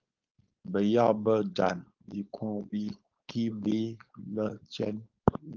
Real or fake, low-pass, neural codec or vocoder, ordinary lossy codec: fake; 7.2 kHz; codec, 16 kHz, 4.8 kbps, FACodec; Opus, 32 kbps